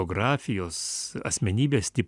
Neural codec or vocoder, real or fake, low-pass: none; real; 10.8 kHz